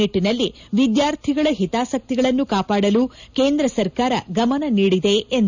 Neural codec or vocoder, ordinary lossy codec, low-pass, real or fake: none; none; 7.2 kHz; real